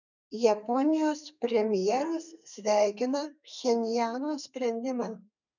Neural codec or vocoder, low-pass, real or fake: codec, 32 kHz, 1.9 kbps, SNAC; 7.2 kHz; fake